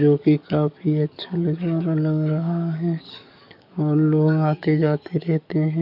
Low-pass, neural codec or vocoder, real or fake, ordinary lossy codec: 5.4 kHz; codec, 44.1 kHz, 7.8 kbps, DAC; fake; none